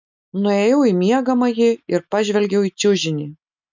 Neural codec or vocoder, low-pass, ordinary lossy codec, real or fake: none; 7.2 kHz; MP3, 64 kbps; real